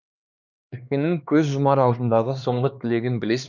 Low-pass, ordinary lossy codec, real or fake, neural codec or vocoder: 7.2 kHz; none; fake; codec, 16 kHz, 2 kbps, X-Codec, HuBERT features, trained on LibriSpeech